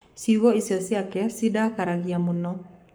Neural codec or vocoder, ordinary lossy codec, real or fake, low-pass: codec, 44.1 kHz, 7.8 kbps, Pupu-Codec; none; fake; none